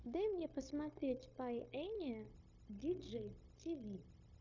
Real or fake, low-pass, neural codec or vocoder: fake; 7.2 kHz; codec, 16 kHz, 0.9 kbps, LongCat-Audio-Codec